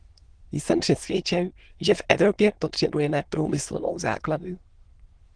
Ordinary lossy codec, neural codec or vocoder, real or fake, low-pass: Opus, 16 kbps; autoencoder, 22.05 kHz, a latent of 192 numbers a frame, VITS, trained on many speakers; fake; 9.9 kHz